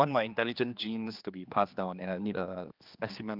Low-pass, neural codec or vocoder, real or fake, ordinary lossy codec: 5.4 kHz; codec, 16 kHz, 2 kbps, X-Codec, HuBERT features, trained on general audio; fake; Opus, 24 kbps